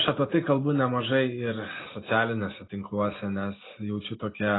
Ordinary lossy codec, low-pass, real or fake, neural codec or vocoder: AAC, 16 kbps; 7.2 kHz; real; none